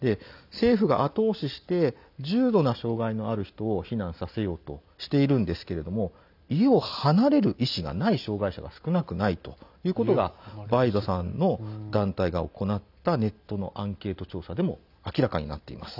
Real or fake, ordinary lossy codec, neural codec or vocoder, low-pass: real; none; none; 5.4 kHz